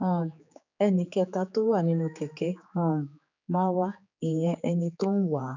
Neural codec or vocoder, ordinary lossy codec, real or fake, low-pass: codec, 16 kHz, 4 kbps, X-Codec, HuBERT features, trained on general audio; none; fake; 7.2 kHz